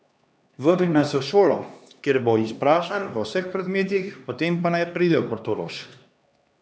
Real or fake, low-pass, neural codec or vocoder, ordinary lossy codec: fake; none; codec, 16 kHz, 2 kbps, X-Codec, HuBERT features, trained on LibriSpeech; none